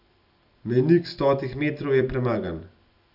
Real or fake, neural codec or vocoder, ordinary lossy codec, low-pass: real; none; none; 5.4 kHz